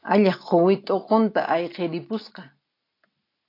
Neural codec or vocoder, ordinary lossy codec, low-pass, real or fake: none; AAC, 32 kbps; 5.4 kHz; real